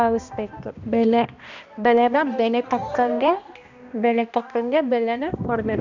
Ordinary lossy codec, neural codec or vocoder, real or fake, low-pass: none; codec, 16 kHz, 1 kbps, X-Codec, HuBERT features, trained on balanced general audio; fake; 7.2 kHz